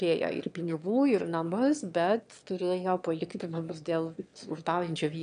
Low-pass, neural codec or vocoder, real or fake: 9.9 kHz; autoencoder, 22.05 kHz, a latent of 192 numbers a frame, VITS, trained on one speaker; fake